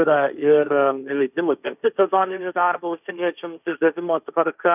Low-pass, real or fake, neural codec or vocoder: 3.6 kHz; fake; codec, 16 kHz, 1.1 kbps, Voila-Tokenizer